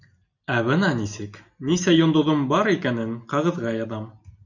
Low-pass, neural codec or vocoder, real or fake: 7.2 kHz; none; real